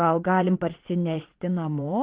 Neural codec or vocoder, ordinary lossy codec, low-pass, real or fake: vocoder, 22.05 kHz, 80 mel bands, Vocos; Opus, 16 kbps; 3.6 kHz; fake